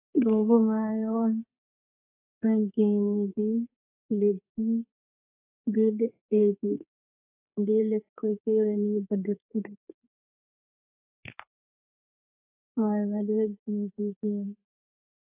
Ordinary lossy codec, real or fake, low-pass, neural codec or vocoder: AAC, 24 kbps; fake; 3.6 kHz; codec, 32 kHz, 1.9 kbps, SNAC